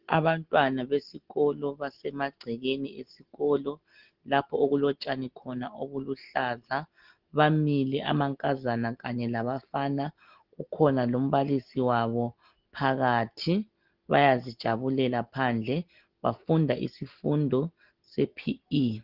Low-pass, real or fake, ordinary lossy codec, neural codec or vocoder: 5.4 kHz; real; Opus, 16 kbps; none